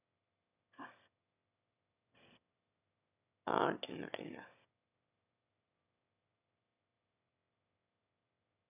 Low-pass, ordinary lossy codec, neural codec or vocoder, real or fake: 3.6 kHz; none; autoencoder, 22.05 kHz, a latent of 192 numbers a frame, VITS, trained on one speaker; fake